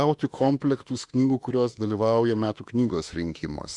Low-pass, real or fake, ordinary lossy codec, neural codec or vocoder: 10.8 kHz; fake; Opus, 64 kbps; autoencoder, 48 kHz, 32 numbers a frame, DAC-VAE, trained on Japanese speech